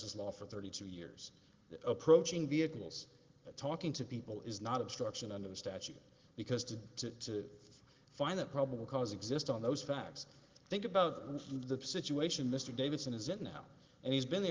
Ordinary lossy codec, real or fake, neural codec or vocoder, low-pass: Opus, 16 kbps; real; none; 7.2 kHz